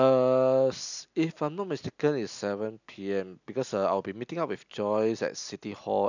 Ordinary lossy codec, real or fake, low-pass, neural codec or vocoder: none; real; 7.2 kHz; none